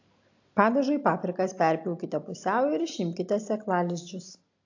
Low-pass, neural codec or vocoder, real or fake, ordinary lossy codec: 7.2 kHz; none; real; AAC, 48 kbps